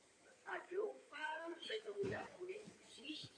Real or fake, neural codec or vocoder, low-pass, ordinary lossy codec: fake; codec, 16 kHz in and 24 kHz out, 2.2 kbps, FireRedTTS-2 codec; 9.9 kHz; MP3, 96 kbps